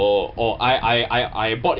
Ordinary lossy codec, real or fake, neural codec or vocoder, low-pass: none; real; none; 5.4 kHz